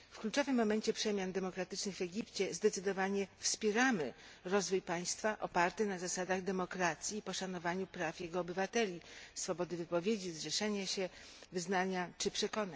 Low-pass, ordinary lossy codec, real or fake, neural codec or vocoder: none; none; real; none